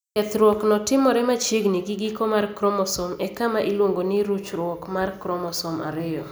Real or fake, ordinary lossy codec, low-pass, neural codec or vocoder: real; none; none; none